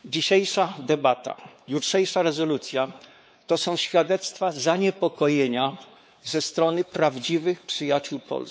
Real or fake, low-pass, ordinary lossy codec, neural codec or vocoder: fake; none; none; codec, 16 kHz, 4 kbps, X-Codec, WavLM features, trained on Multilingual LibriSpeech